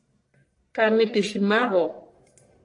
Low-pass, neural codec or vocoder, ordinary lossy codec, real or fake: 10.8 kHz; codec, 44.1 kHz, 1.7 kbps, Pupu-Codec; AAC, 48 kbps; fake